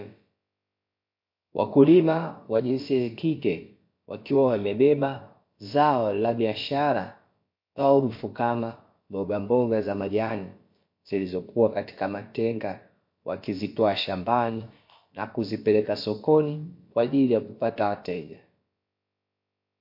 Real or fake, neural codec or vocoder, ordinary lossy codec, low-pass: fake; codec, 16 kHz, about 1 kbps, DyCAST, with the encoder's durations; MP3, 32 kbps; 5.4 kHz